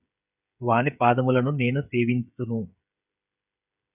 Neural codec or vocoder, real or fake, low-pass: codec, 16 kHz, 16 kbps, FreqCodec, smaller model; fake; 3.6 kHz